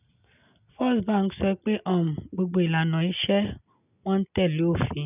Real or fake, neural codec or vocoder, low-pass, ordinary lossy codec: real; none; 3.6 kHz; none